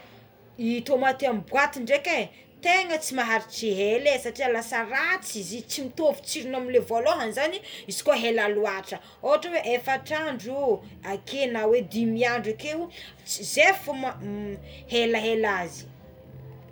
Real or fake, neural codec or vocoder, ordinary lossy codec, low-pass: real; none; none; none